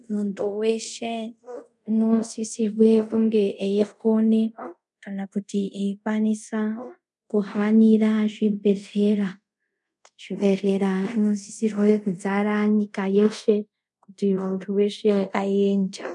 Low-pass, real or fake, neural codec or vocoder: 10.8 kHz; fake; codec, 24 kHz, 0.5 kbps, DualCodec